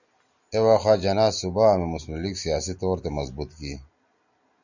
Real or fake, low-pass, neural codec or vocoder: real; 7.2 kHz; none